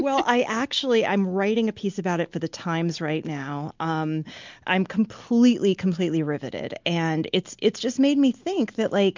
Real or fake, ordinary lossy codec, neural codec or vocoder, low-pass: real; MP3, 64 kbps; none; 7.2 kHz